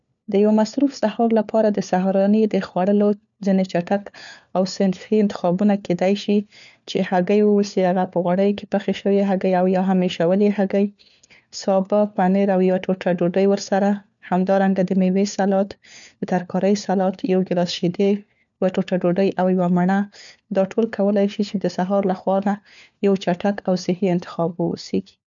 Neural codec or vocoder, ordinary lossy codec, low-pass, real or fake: codec, 16 kHz, 4 kbps, FunCodec, trained on LibriTTS, 50 frames a second; none; 7.2 kHz; fake